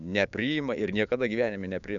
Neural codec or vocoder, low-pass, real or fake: codec, 16 kHz, 6 kbps, DAC; 7.2 kHz; fake